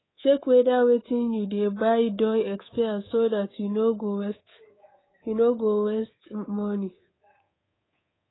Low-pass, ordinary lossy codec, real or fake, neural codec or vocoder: 7.2 kHz; AAC, 16 kbps; fake; codec, 24 kHz, 3.1 kbps, DualCodec